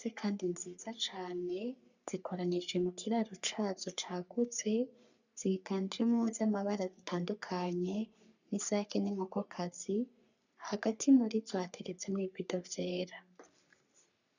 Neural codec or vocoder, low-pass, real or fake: codec, 44.1 kHz, 3.4 kbps, Pupu-Codec; 7.2 kHz; fake